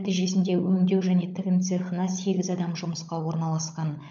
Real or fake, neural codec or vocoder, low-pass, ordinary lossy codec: fake; codec, 16 kHz, 16 kbps, FunCodec, trained on LibriTTS, 50 frames a second; 7.2 kHz; none